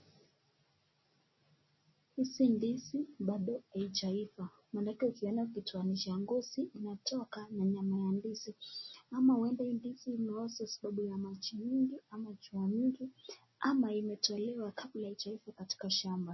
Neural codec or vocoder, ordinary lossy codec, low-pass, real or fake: none; MP3, 24 kbps; 7.2 kHz; real